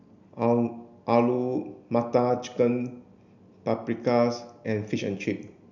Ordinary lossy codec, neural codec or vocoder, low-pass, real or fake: none; none; 7.2 kHz; real